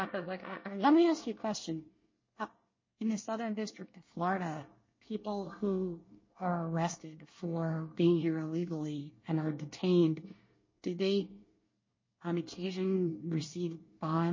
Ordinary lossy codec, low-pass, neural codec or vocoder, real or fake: MP3, 32 kbps; 7.2 kHz; codec, 24 kHz, 1 kbps, SNAC; fake